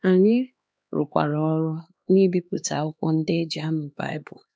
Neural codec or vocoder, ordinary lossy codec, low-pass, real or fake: codec, 16 kHz, 2 kbps, X-Codec, HuBERT features, trained on LibriSpeech; none; none; fake